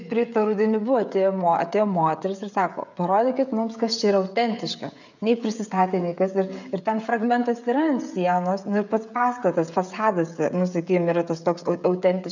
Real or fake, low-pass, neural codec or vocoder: fake; 7.2 kHz; codec, 16 kHz, 16 kbps, FreqCodec, smaller model